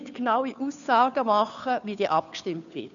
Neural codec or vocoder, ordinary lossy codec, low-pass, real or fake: codec, 16 kHz, 2 kbps, FunCodec, trained on Chinese and English, 25 frames a second; none; 7.2 kHz; fake